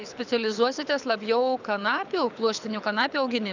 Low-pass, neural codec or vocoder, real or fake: 7.2 kHz; codec, 24 kHz, 6 kbps, HILCodec; fake